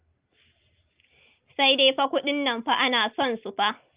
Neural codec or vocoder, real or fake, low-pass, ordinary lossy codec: none; real; 3.6 kHz; Opus, 24 kbps